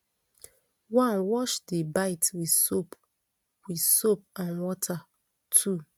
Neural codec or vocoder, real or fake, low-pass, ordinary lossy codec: none; real; none; none